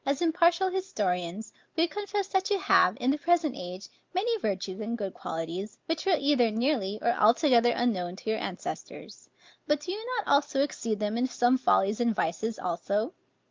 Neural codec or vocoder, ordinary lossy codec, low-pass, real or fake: none; Opus, 24 kbps; 7.2 kHz; real